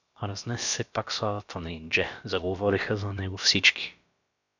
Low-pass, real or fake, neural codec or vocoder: 7.2 kHz; fake; codec, 16 kHz, about 1 kbps, DyCAST, with the encoder's durations